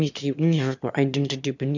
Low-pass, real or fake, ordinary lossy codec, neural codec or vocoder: 7.2 kHz; fake; none; autoencoder, 22.05 kHz, a latent of 192 numbers a frame, VITS, trained on one speaker